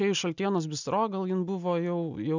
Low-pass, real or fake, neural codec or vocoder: 7.2 kHz; real; none